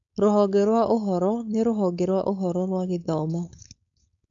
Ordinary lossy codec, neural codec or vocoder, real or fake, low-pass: AAC, 64 kbps; codec, 16 kHz, 4.8 kbps, FACodec; fake; 7.2 kHz